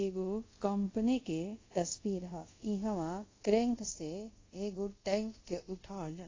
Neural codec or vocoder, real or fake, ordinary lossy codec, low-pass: codec, 24 kHz, 0.5 kbps, DualCodec; fake; AAC, 32 kbps; 7.2 kHz